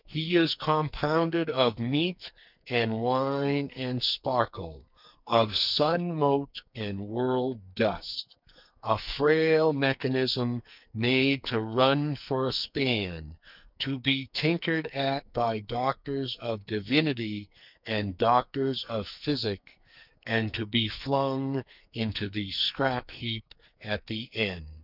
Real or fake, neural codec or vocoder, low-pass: fake; codec, 44.1 kHz, 2.6 kbps, SNAC; 5.4 kHz